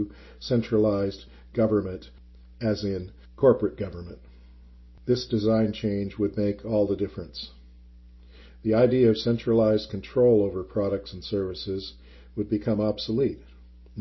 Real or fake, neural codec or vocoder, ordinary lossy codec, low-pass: real; none; MP3, 24 kbps; 7.2 kHz